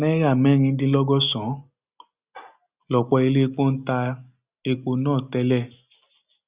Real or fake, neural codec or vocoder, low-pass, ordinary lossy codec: real; none; 3.6 kHz; Opus, 64 kbps